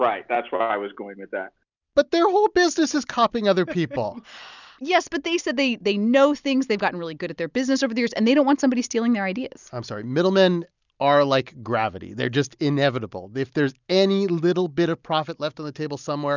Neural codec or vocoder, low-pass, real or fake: none; 7.2 kHz; real